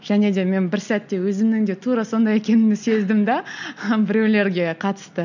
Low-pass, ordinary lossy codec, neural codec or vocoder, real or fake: 7.2 kHz; none; none; real